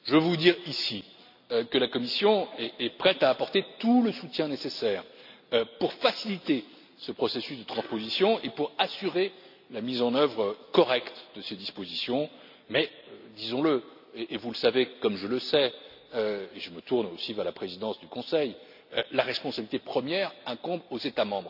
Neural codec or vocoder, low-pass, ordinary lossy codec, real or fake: none; 5.4 kHz; none; real